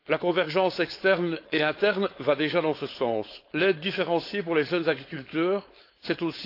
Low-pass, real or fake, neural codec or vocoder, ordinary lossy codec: 5.4 kHz; fake; codec, 16 kHz, 4.8 kbps, FACodec; AAC, 32 kbps